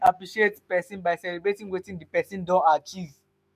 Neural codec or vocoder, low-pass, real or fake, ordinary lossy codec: autoencoder, 48 kHz, 128 numbers a frame, DAC-VAE, trained on Japanese speech; 14.4 kHz; fake; MP3, 64 kbps